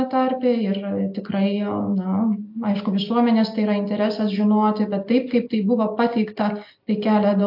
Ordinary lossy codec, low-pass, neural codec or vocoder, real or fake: AAC, 48 kbps; 5.4 kHz; none; real